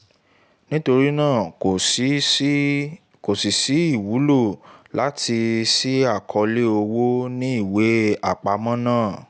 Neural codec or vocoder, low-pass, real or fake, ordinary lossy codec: none; none; real; none